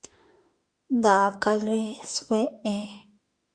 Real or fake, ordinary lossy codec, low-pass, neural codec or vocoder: fake; Opus, 64 kbps; 9.9 kHz; autoencoder, 48 kHz, 32 numbers a frame, DAC-VAE, trained on Japanese speech